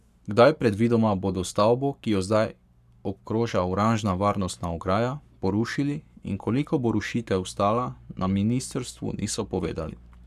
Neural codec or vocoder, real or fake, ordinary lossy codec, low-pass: codec, 44.1 kHz, 7.8 kbps, Pupu-Codec; fake; none; 14.4 kHz